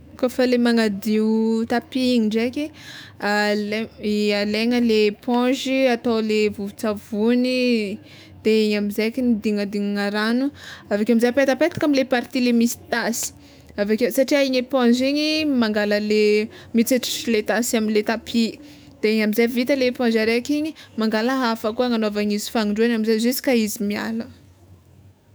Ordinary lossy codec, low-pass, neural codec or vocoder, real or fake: none; none; autoencoder, 48 kHz, 128 numbers a frame, DAC-VAE, trained on Japanese speech; fake